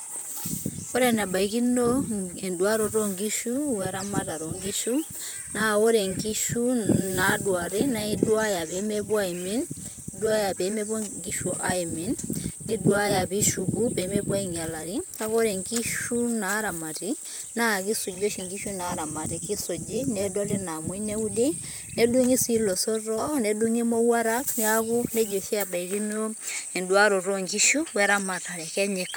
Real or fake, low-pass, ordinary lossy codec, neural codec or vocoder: fake; none; none; vocoder, 44.1 kHz, 128 mel bands, Pupu-Vocoder